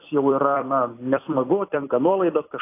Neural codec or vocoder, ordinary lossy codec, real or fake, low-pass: vocoder, 44.1 kHz, 128 mel bands every 256 samples, BigVGAN v2; AAC, 24 kbps; fake; 3.6 kHz